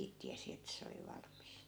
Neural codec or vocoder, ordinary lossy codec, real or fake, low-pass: none; none; real; none